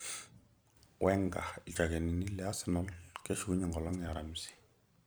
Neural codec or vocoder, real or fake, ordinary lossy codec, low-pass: vocoder, 44.1 kHz, 128 mel bands every 256 samples, BigVGAN v2; fake; none; none